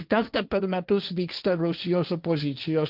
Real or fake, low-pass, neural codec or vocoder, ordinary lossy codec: fake; 5.4 kHz; codec, 16 kHz, 1.1 kbps, Voila-Tokenizer; Opus, 24 kbps